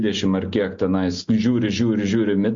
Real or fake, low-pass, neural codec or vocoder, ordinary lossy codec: real; 7.2 kHz; none; MP3, 48 kbps